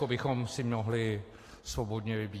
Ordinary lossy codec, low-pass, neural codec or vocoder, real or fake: AAC, 48 kbps; 14.4 kHz; none; real